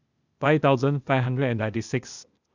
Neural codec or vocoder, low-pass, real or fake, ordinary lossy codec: codec, 16 kHz, 0.8 kbps, ZipCodec; 7.2 kHz; fake; none